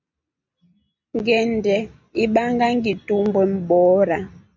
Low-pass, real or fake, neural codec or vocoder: 7.2 kHz; real; none